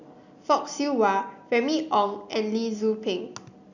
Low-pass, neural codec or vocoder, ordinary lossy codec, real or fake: 7.2 kHz; none; none; real